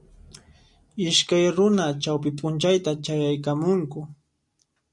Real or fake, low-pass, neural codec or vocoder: real; 10.8 kHz; none